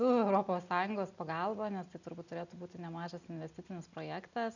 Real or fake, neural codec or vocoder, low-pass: real; none; 7.2 kHz